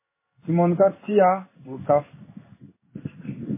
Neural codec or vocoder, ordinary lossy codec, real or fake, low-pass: codec, 16 kHz in and 24 kHz out, 1 kbps, XY-Tokenizer; MP3, 16 kbps; fake; 3.6 kHz